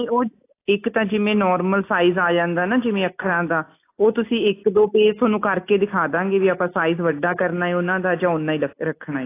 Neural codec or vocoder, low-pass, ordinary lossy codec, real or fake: none; 3.6 kHz; AAC, 24 kbps; real